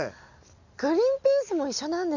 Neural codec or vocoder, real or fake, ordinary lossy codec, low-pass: none; real; none; 7.2 kHz